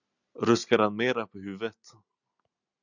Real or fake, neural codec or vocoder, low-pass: real; none; 7.2 kHz